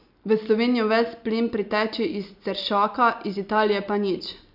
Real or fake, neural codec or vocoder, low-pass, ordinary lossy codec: real; none; 5.4 kHz; none